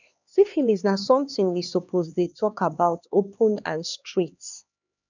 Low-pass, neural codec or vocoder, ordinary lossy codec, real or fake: 7.2 kHz; codec, 16 kHz, 2 kbps, X-Codec, HuBERT features, trained on LibriSpeech; none; fake